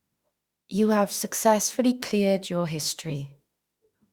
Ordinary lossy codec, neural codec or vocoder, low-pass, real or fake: Opus, 64 kbps; autoencoder, 48 kHz, 32 numbers a frame, DAC-VAE, trained on Japanese speech; 19.8 kHz; fake